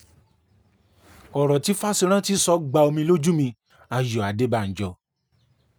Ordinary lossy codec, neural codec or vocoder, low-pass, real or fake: none; none; none; real